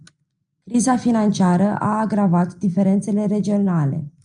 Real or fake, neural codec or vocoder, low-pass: real; none; 9.9 kHz